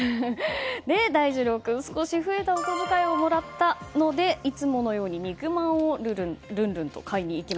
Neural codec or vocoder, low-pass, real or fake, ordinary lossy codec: none; none; real; none